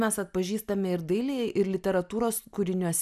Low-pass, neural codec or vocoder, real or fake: 14.4 kHz; none; real